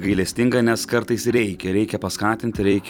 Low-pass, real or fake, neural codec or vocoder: 19.8 kHz; fake; vocoder, 44.1 kHz, 128 mel bands every 256 samples, BigVGAN v2